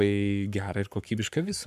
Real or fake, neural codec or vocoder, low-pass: fake; codec, 44.1 kHz, 7.8 kbps, Pupu-Codec; 14.4 kHz